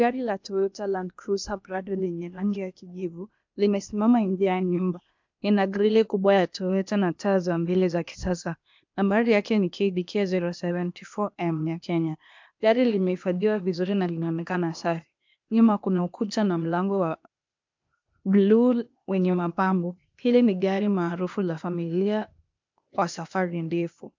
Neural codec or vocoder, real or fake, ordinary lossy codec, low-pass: codec, 16 kHz, 0.8 kbps, ZipCodec; fake; MP3, 64 kbps; 7.2 kHz